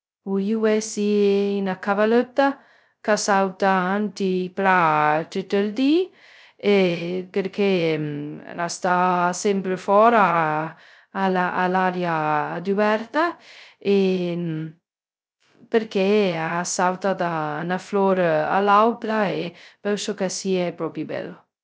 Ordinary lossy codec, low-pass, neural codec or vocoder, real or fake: none; none; codec, 16 kHz, 0.2 kbps, FocalCodec; fake